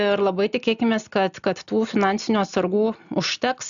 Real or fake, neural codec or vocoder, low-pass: real; none; 7.2 kHz